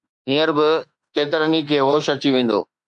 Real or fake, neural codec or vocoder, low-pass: fake; autoencoder, 48 kHz, 32 numbers a frame, DAC-VAE, trained on Japanese speech; 10.8 kHz